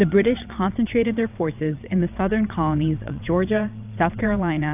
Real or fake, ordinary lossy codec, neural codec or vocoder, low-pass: fake; AAC, 32 kbps; vocoder, 22.05 kHz, 80 mel bands, WaveNeXt; 3.6 kHz